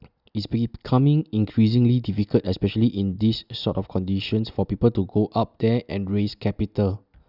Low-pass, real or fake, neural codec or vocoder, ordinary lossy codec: 5.4 kHz; real; none; none